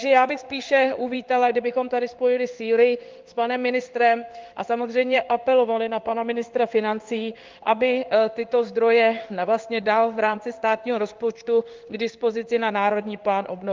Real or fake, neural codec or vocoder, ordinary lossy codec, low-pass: fake; codec, 16 kHz in and 24 kHz out, 1 kbps, XY-Tokenizer; Opus, 32 kbps; 7.2 kHz